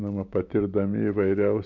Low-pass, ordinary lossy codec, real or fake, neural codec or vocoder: 7.2 kHz; MP3, 48 kbps; real; none